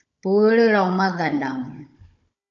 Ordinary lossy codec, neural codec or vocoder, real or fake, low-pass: AAC, 64 kbps; codec, 16 kHz, 16 kbps, FunCodec, trained on Chinese and English, 50 frames a second; fake; 7.2 kHz